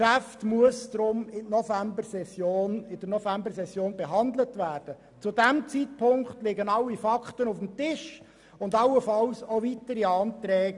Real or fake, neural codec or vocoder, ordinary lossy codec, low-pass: real; none; none; 10.8 kHz